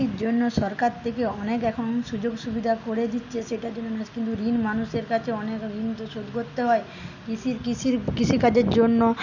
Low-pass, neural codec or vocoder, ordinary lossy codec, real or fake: 7.2 kHz; none; none; real